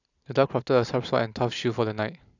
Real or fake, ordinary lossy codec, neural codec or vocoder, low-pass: real; none; none; 7.2 kHz